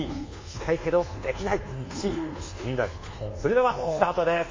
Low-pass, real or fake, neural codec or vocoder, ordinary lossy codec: 7.2 kHz; fake; codec, 24 kHz, 1.2 kbps, DualCodec; MP3, 32 kbps